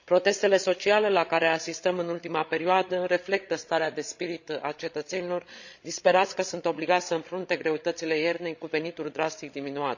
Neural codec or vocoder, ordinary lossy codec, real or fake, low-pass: codec, 16 kHz, 16 kbps, FreqCodec, larger model; none; fake; 7.2 kHz